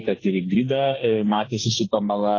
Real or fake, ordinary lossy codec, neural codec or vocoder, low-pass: fake; AAC, 32 kbps; codec, 44.1 kHz, 2.6 kbps, SNAC; 7.2 kHz